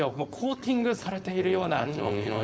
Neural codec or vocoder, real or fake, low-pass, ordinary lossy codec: codec, 16 kHz, 4.8 kbps, FACodec; fake; none; none